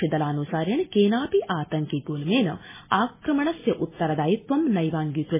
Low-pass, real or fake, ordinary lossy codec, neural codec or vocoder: 3.6 kHz; real; MP3, 16 kbps; none